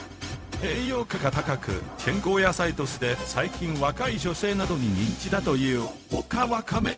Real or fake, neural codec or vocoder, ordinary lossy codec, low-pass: fake; codec, 16 kHz, 0.4 kbps, LongCat-Audio-Codec; none; none